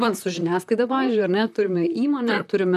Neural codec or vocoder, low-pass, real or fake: vocoder, 44.1 kHz, 128 mel bands, Pupu-Vocoder; 14.4 kHz; fake